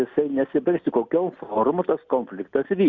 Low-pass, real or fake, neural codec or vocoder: 7.2 kHz; real; none